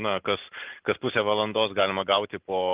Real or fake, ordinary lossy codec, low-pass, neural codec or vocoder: real; Opus, 24 kbps; 3.6 kHz; none